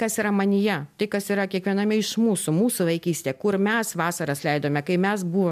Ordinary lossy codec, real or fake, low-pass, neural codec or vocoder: MP3, 96 kbps; real; 14.4 kHz; none